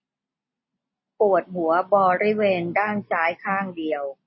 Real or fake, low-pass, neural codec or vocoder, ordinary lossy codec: real; 7.2 kHz; none; MP3, 24 kbps